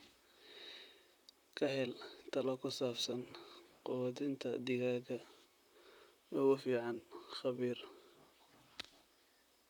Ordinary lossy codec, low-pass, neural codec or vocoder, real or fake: none; 19.8 kHz; vocoder, 44.1 kHz, 128 mel bands, Pupu-Vocoder; fake